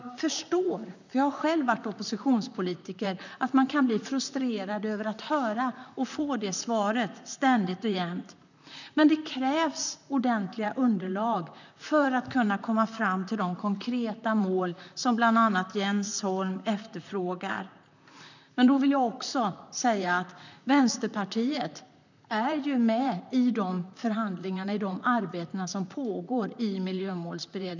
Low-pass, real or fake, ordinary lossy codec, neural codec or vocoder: 7.2 kHz; fake; none; vocoder, 44.1 kHz, 128 mel bands, Pupu-Vocoder